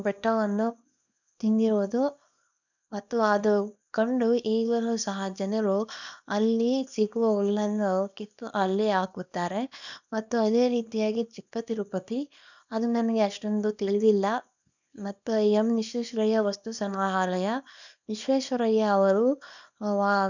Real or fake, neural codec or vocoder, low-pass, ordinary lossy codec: fake; codec, 24 kHz, 0.9 kbps, WavTokenizer, small release; 7.2 kHz; none